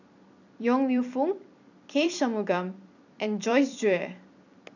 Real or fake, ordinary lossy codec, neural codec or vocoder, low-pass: fake; none; vocoder, 44.1 kHz, 128 mel bands every 256 samples, BigVGAN v2; 7.2 kHz